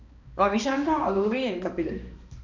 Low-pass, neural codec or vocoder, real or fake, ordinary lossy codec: 7.2 kHz; codec, 16 kHz, 2 kbps, X-Codec, HuBERT features, trained on balanced general audio; fake; none